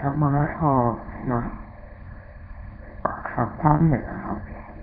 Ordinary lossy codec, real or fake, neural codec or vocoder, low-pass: none; fake; codec, 24 kHz, 0.9 kbps, WavTokenizer, small release; 5.4 kHz